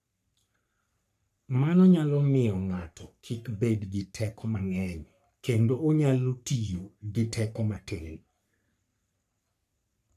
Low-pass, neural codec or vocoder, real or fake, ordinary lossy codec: 14.4 kHz; codec, 44.1 kHz, 3.4 kbps, Pupu-Codec; fake; none